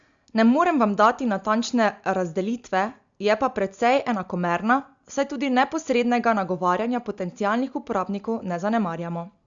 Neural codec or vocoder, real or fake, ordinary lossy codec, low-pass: none; real; Opus, 64 kbps; 7.2 kHz